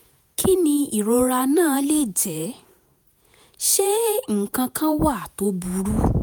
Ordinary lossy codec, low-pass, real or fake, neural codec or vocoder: none; none; fake; vocoder, 48 kHz, 128 mel bands, Vocos